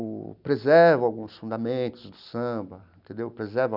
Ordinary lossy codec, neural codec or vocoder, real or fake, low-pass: none; none; real; 5.4 kHz